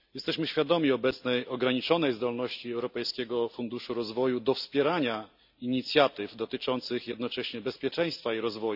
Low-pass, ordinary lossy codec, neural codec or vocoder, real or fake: 5.4 kHz; none; none; real